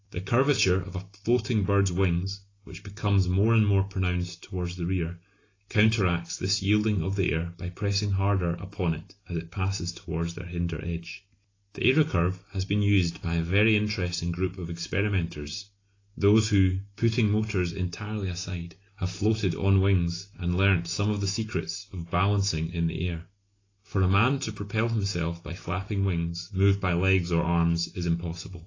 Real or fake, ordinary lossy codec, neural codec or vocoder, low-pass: real; AAC, 32 kbps; none; 7.2 kHz